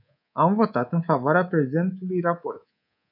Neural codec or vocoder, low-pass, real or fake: codec, 24 kHz, 3.1 kbps, DualCodec; 5.4 kHz; fake